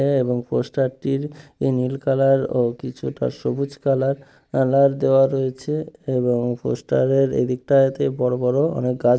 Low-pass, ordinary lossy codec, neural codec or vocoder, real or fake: none; none; none; real